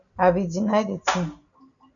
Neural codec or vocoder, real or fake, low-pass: none; real; 7.2 kHz